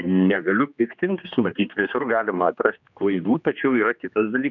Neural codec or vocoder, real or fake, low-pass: codec, 16 kHz, 2 kbps, X-Codec, HuBERT features, trained on general audio; fake; 7.2 kHz